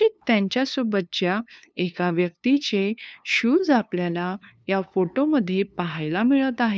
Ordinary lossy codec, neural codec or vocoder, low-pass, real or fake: none; codec, 16 kHz, 2 kbps, FunCodec, trained on LibriTTS, 25 frames a second; none; fake